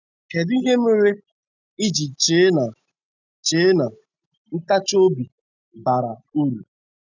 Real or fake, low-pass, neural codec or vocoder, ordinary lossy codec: real; 7.2 kHz; none; none